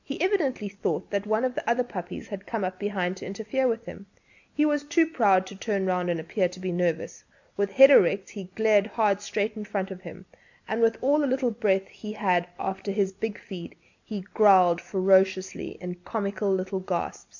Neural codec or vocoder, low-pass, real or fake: none; 7.2 kHz; real